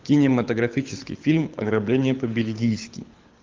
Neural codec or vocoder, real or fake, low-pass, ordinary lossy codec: codec, 24 kHz, 6 kbps, HILCodec; fake; 7.2 kHz; Opus, 32 kbps